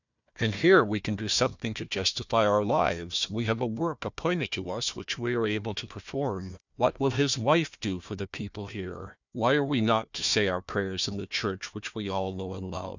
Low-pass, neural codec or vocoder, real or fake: 7.2 kHz; codec, 16 kHz, 1 kbps, FunCodec, trained on Chinese and English, 50 frames a second; fake